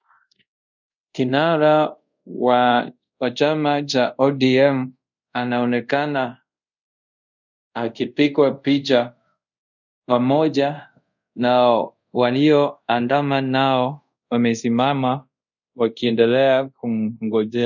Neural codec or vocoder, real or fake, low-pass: codec, 24 kHz, 0.5 kbps, DualCodec; fake; 7.2 kHz